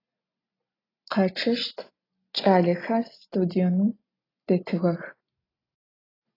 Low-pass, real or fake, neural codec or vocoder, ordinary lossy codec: 5.4 kHz; real; none; AAC, 24 kbps